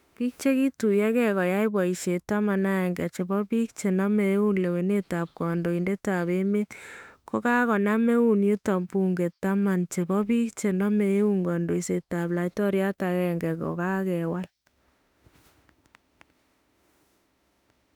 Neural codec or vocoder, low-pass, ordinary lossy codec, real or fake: autoencoder, 48 kHz, 32 numbers a frame, DAC-VAE, trained on Japanese speech; 19.8 kHz; none; fake